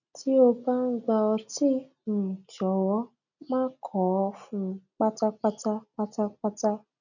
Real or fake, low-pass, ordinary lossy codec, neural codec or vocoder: real; 7.2 kHz; none; none